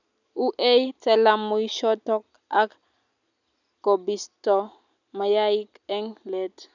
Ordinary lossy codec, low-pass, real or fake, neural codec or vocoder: none; 7.2 kHz; real; none